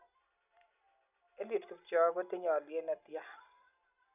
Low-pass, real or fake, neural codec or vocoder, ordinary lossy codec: 3.6 kHz; real; none; none